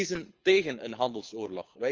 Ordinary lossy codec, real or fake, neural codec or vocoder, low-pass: Opus, 24 kbps; fake; codec, 24 kHz, 6 kbps, HILCodec; 7.2 kHz